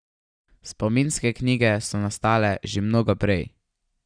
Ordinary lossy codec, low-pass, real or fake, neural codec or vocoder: none; 9.9 kHz; real; none